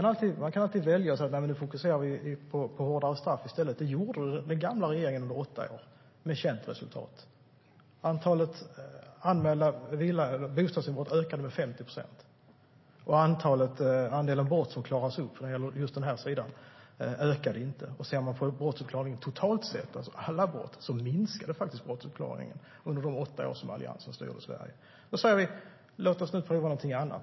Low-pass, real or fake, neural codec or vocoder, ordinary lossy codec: 7.2 kHz; real; none; MP3, 24 kbps